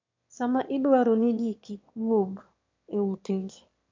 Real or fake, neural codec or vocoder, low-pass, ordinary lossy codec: fake; autoencoder, 22.05 kHz, a latent of 192 numbers a frame, VITS, trained on one speaker; 7.2 kHz; MP3, 48 kbps